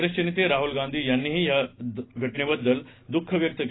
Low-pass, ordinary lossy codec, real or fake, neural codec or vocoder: 7.2 kHz; AAC, 16 kbps; real; none